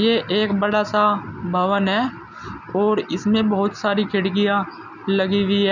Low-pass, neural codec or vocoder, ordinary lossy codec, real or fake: 7.2 kHz; none; none; real